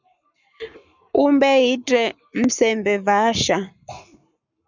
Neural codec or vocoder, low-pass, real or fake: codec, 44.1 kHz, 7.8 kbps, Pupu-Codec; 7.2 kHz; fake